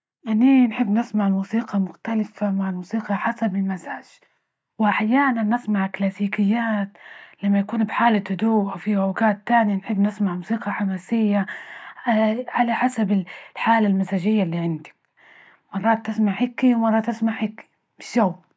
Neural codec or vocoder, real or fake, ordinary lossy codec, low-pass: none; real; none; none